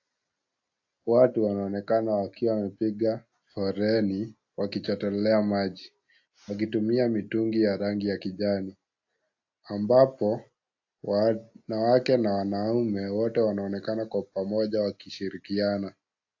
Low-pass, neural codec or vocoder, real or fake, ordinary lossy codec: 7.2 kHz; none; real; AAC, 48 kbps